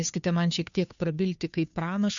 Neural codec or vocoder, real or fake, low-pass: codec, 16 kHz, 2 kbps, FunCodec, trained on Chinese and English, 25 frames a second; fake; 7.2 kHz